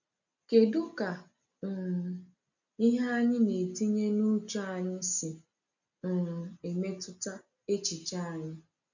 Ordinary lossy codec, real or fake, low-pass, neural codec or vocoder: none; real; 7.2 kHz; none